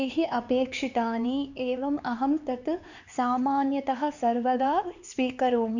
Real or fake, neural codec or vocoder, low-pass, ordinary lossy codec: fake; codec, 16 kHz, 4 kbps, X-Codec, HuBERT features, trained on LibriSpeech; 7.2 kHz; none